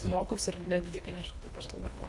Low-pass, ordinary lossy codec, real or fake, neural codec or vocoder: 10.8 kHz; AAC, 64 kbps; fake; codec, 24 kHz, 1.5 kbps, HILCodec